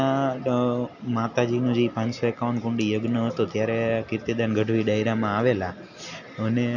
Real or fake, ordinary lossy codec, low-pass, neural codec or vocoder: real; none; 7.2 kHz; none